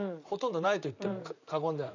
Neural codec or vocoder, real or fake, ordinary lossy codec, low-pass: vocoder, 44.1 kHz, 128 mel bands, Pupu-Vocoder; fake; none; 7.2 kHz